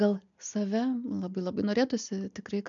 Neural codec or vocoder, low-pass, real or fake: none; 7.2 kHz; real